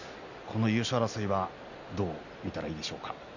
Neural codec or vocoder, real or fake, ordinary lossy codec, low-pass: none; real; none; 7.2 kHz